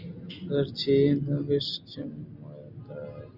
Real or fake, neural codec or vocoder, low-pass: real; none; 5.4 kHz